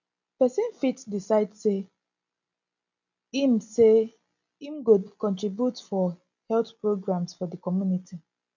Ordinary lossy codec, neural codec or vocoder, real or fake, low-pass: none; none; real; 7.2 kHz